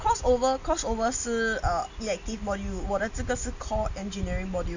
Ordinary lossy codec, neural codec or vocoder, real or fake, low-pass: Opus, 64 kbps; none; real; 7.2 kHz